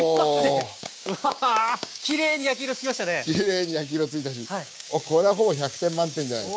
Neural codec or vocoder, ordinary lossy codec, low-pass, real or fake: codec, 16 kHz, 6 kbps, DAC; none; none; fake